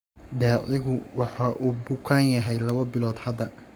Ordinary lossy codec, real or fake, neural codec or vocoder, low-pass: none; fake; codec, 44.1 kHz, 7.8 kbps, Pupu-Codec; none